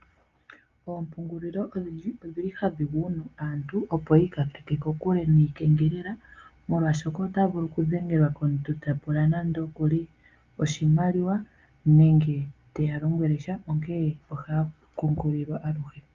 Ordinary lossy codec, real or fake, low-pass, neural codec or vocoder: Opus, 32 kbps; real; 7.2 kHz; none